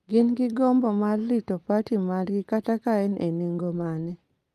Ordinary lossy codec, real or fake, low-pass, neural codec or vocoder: Opus, 32 kbps; real; 14.4 kHz; none